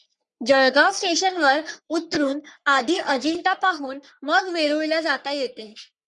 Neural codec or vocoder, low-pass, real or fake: codec, 44.1 kHz, 3.4 kbps, Pupu-Codec; 10.8 kHz; fake